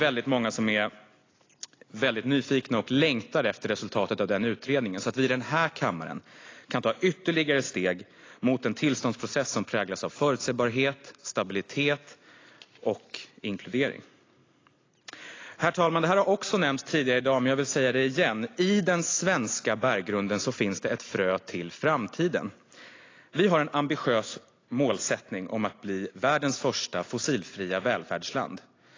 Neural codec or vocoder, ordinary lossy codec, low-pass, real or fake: none; AAC, 32 kbps; 7.2 kHz; real